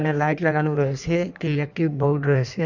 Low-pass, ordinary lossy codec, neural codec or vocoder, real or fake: 7.2 kHz; none; codec, 16 kHz in and 24 kHz out, 1.1 kbps, FireRedTTS-2 codec; fake